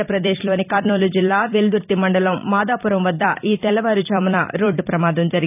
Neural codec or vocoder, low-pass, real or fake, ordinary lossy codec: vocoder, 44.1 kHz, 128 mel bands every 256 samples, BigVGAN v2; 3.6 kHz; fake; none